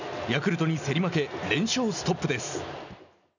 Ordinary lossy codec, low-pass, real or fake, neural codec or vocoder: none; 7.2 kHz; real; none